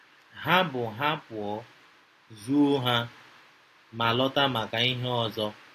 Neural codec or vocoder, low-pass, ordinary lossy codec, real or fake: none; 14.4 kHz; AAC, 48 kbps; real